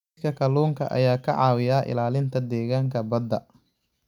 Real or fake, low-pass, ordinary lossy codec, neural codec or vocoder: real; 19.8 kHz; none; none